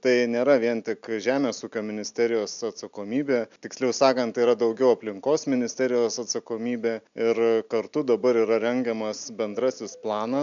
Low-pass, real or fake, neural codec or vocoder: 7.2 kHz; real; none